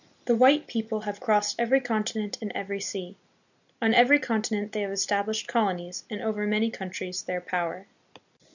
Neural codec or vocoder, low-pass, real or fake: none; 7.2 kHz; real